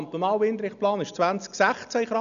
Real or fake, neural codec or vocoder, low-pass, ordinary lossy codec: real; none; 7.2 kHz; none